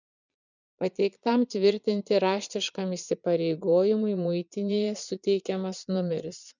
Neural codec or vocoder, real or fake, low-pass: vocoder, 22.05 kHz, 80 mel bands, Vocos; fake; 7.2 kHz